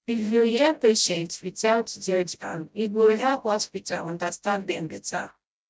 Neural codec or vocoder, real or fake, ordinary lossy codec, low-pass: codec, 16 kHz, 0.5 kbps, FreqCodec, smaller model; fake; none; none